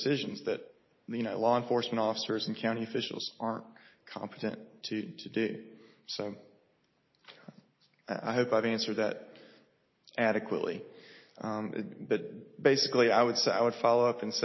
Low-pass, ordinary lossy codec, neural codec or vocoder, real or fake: 7.2 kHz; MP3, 24 kbps; none; real